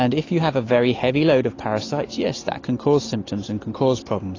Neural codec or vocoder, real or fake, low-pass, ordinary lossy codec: none; real; 7.2 kHz; AAC, 32 kbps